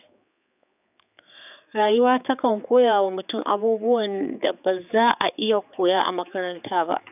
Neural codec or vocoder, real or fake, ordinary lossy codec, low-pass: codec, 16 kHz, 4 kbps, X-Codec, HuBERT features, trained on general audio; fake; none; 3.6 kHz